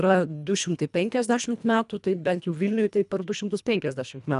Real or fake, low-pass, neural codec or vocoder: fake; 10.8 kHz; codec, 24 kHz, 1.5 kbps, HILCodec